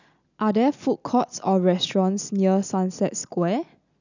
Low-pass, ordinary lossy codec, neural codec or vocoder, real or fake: 7.2 kHz; none; none; real